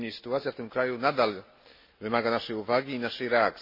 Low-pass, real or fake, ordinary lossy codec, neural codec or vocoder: 5.4 kHz; real; MP3, 24 kbps; none